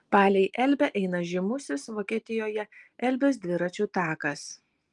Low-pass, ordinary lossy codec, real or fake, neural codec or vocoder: 10.8 kHz; Opus, 32 kbps; real; none